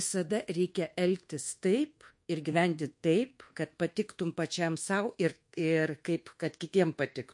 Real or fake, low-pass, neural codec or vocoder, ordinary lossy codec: fake; 10.8 kHz; codec, 24 kHz, 1.2 kbps, DualCodec; MP3, 48 kbps